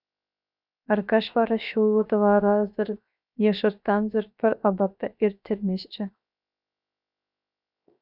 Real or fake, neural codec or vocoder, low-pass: fake; codec, 16 kHz, 0.7 kbps, FocalCodec; 5.4 kHz